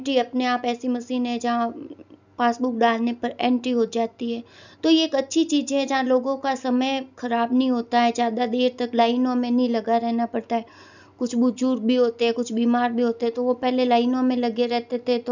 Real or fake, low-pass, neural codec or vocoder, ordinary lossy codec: real; 7.2 kHz; none; none